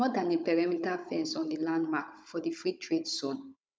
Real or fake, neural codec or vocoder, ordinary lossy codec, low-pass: fake; codec, 16 kHz, 16 kbps, FunCodec, trained on Chinese and English, 50 frames a second; none; none